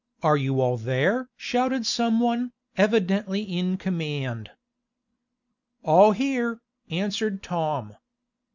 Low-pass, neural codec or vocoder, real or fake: 7.2 kHz; none; real